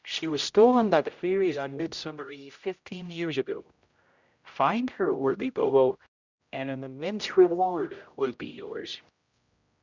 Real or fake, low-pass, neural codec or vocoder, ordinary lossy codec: fake; 7.2 kHz; codec, 16 kHz, 0.5 kbps, X-Codec, HuBERT features, trained on general audio; Opus, 64 kbps